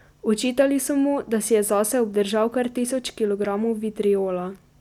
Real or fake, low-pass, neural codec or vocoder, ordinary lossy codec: real; 19.8 kHz; none; none